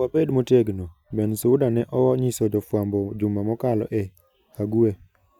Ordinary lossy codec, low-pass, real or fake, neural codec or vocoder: none; 19.8 kHz; real; none